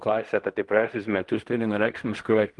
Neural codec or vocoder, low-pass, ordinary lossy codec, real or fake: codec, 16 kHz in and 24 kHz out, 0.4 kbps, LongCat-Audio-Codec, fine tuned four codebook decoder; 10.8 kHz; Opus, 24 kbps; fake